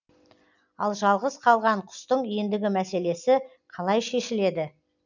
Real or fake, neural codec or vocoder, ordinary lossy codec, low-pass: real; none; none; 7.2 kHz